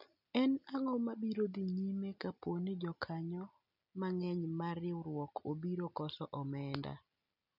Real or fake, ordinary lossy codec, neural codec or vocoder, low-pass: real; none; none; 5.4 kHz